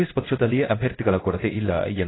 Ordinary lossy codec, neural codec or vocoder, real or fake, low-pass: AAC, 16 kbps; codec, 16 kHz in and 24 kHz out, 1 kbps, XY-Tokenizer; fake; 7.2 kHz